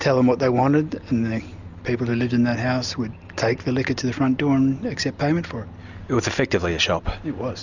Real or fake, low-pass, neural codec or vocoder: real; 7.2 kHz; none